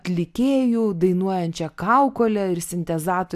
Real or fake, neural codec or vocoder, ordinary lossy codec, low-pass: real; none; AAC, 96 kbps; 14.4 kHz